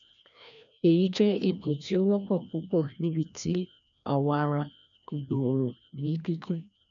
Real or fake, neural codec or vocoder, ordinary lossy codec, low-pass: fake; codec, 16 kHz, 1 kbps, FreqCodec, larger model; none; 7.2 kHz